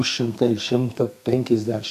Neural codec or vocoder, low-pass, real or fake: codec, 32 kHz, 1.9 kbps, SNAC; 14.4 kHz; fake